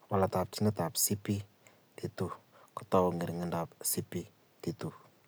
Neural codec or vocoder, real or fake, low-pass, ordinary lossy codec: none; real; none; none